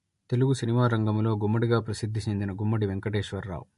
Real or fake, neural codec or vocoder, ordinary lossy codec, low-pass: real; none; MP3, 48 kbps; 14.4 kHz